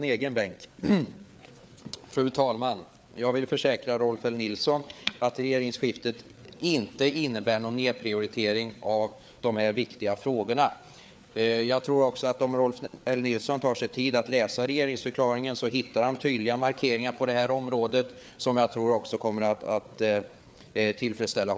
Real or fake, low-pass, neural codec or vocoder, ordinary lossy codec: fake; none; codec, 16 kHz, 4 kbps, FreqCodec, larger model; none